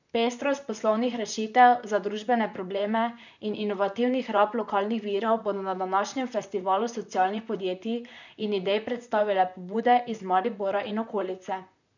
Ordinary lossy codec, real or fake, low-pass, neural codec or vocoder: none; fake; 7.2 kHz; vocoder, 44.1 kHz, 128 mel bands, Pupu-Vocoder